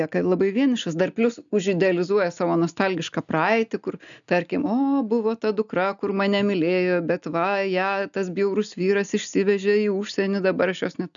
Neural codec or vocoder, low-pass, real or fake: none; 7.2 kHz; real